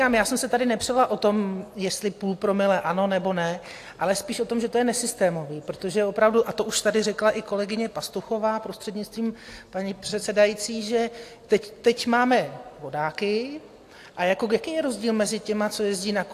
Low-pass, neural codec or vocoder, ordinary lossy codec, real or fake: 14.4 kHz; none; AAC, 64 kbps; real